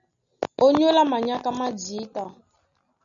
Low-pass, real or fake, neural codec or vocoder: 7.2 kHz; real; none